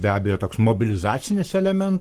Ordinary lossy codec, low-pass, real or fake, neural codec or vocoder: Opus, 24 kbps; 14.4 kHz; fake; codec, 44.1 kHz, 7.8 kbps, Pupu-Codec